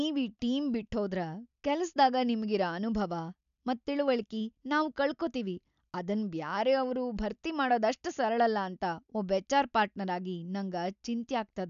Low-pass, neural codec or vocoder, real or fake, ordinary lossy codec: 7.2 kHz; none; real; none